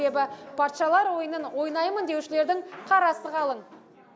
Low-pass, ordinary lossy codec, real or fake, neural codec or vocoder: none; none; real; none